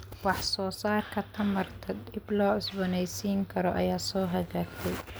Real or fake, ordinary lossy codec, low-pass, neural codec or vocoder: real; none; none; none